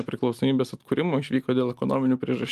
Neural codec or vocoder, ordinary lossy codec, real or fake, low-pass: none; Opus, 32 kbps; real; 14.4 kHz